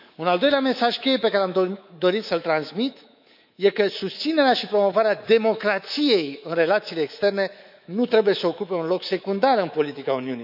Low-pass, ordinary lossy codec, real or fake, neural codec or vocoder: 5.4 kHz; none; fake; codec, 24 kHz, 3.1 kbps, DualCodec